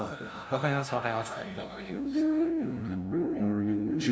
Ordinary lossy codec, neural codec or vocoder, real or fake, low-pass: none; codec, 16 kHz, 0.5 kbps, FunCodec, trained on LibriTTS, 25 frames a second; fake; none